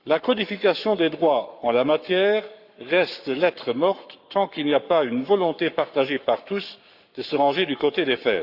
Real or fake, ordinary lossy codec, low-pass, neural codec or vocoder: fake; Opus, 64 kbps; 5.4 kHz; codec, 44.1 kHz, 7.8 kbps, Pupu-Codec